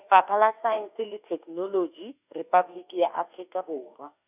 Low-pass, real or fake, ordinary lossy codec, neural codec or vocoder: 3.6 kHz; fake; none; autoencoder, 48 kHz, 32 numbers a frame, DAC-VAE, trained on Japanese speech